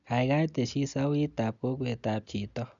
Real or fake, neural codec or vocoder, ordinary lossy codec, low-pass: real; none; none; 7.2 kHz